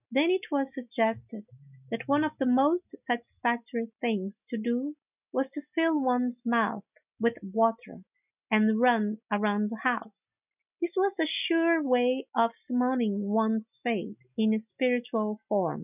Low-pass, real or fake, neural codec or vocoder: 3.6 kHz; real; none